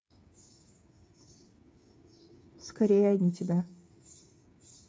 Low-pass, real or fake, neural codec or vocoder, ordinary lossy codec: none; fake; codec, 16 kHz, 8 kbps, FreqCodec, smaller model; none